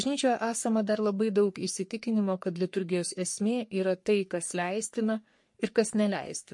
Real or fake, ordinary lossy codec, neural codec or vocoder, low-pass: fake; MP3, 48 kbps; codec, 44.1 kHz, 3.4 kbps, Pupu-Codec; 10.8 kHz